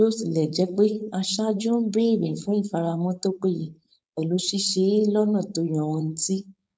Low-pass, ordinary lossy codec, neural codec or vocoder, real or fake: none; none; codec, 16 kHz, 4.8 kbps, FACodec; fake